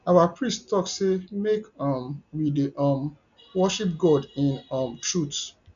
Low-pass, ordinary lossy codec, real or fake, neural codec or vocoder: 7.2 kHz; MP3, 96 kbps; real; none